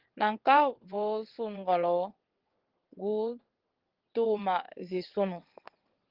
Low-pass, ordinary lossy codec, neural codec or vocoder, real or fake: 5.4 kHz; Opus, 16 kbps; vocoder, 22.05 kHz, 80 mel bands, WaveNeXt; fake